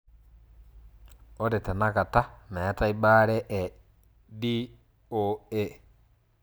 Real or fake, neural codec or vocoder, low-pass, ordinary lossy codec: real; none; none; none